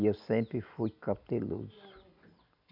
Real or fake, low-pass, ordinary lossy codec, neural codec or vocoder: real; 5.4 kHz; none; none